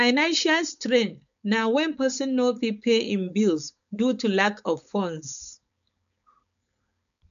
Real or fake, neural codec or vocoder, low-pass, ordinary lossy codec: fake; codec, 16 kHz, 4.8 kbps, FACodec; 7.2 kHz; none